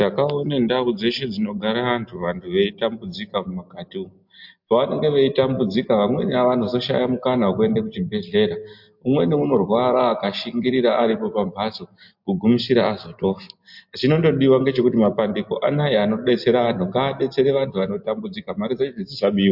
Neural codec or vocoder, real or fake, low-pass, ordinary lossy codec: none; real; 5.4 kHz; MP3, 48 kbps